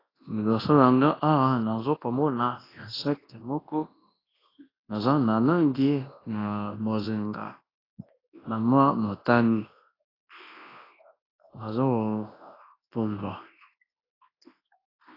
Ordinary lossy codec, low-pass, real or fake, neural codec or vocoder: AAC, 24 kbps; 5.4 kHz; fake; codec, 24 kHz, 0.9 kbps, WavTokenizer, large speech release